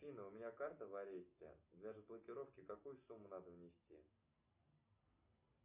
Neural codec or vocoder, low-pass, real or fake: none; 3.6 kHz; real